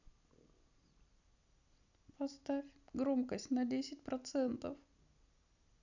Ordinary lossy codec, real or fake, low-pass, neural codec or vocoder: none; real; 7.2 kHz; none